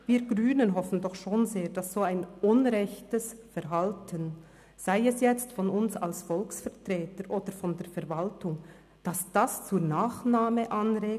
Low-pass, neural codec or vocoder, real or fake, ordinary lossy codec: 14.4 kHz; none; real; none